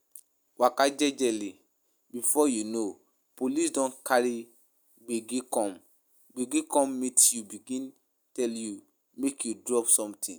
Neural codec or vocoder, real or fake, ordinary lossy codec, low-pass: none; real; none; none